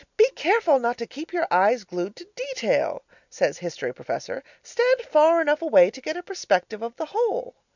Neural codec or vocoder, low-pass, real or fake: none; 7.2 kHz; real